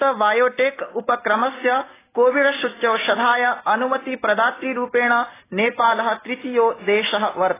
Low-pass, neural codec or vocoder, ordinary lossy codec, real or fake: 3.6 kHz; none; AAC, 16 kbps; real